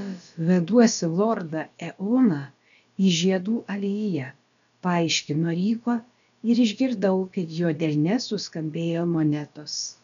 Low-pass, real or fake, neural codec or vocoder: 7.2 kHz; fake; codec, 16 kHz, about 1 kbps, DyCAST, with the encoder's durations